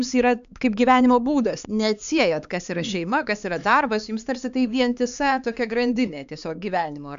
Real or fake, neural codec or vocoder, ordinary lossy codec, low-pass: fake; codec, 16 kHz, 4 kbps, X-Codec, HuBERT features, trained on LibriSpeech; AAC, 96 kbps; 7.2 kHz